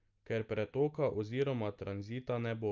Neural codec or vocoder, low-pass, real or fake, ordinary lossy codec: none; 7.2 kHz; real; Opus, 24 kbps